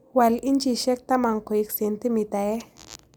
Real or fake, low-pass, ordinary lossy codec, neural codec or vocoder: real; none; none; none